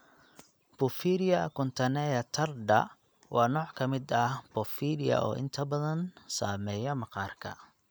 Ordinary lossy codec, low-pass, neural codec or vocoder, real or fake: none; none; none; real